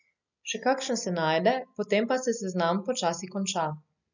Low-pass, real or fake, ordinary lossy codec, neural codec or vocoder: 7.2 kHz; real; none; none